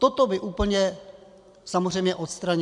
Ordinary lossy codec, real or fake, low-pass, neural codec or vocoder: MP3, 96 kbps; real; 10.8 kHz; none